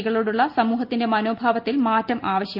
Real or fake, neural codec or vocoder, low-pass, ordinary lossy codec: real; none; 5.4 kHz; Opus, 24 kbps